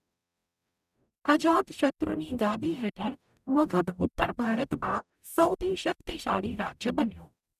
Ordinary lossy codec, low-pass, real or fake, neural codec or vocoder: none; 14.4 kHz; fake; codec, 44.1 kHz, 0.9 kbps, DAC